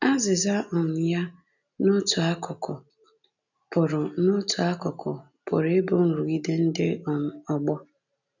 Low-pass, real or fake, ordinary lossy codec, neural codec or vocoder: 7.2 kHz; real; none; none